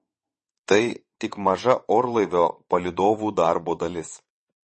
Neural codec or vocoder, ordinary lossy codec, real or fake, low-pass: vocoder, 22.05 kHz, 80 mel bands, WaveNeXt; MP3, 32 kbps; fake; 9.9 kHz